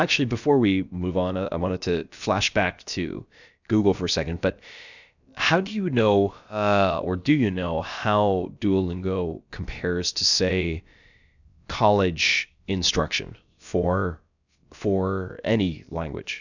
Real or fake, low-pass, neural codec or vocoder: fake; 7.2 kHz; codec, 16 kHz, about 1 kbps, DyCAST, with the encoder's durations